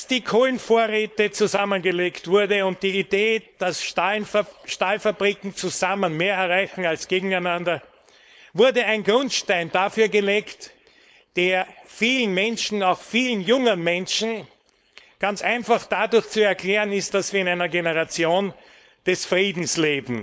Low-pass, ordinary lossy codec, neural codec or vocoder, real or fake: none; none; codec, 16 kHz, 4.8 kbps, FACodec; fake